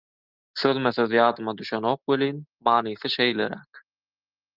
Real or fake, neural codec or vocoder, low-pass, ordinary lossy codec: real; none; 5.4 kHz; Opus, 24 kbps